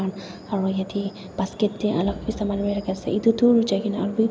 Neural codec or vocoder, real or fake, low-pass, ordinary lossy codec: none; real; none; none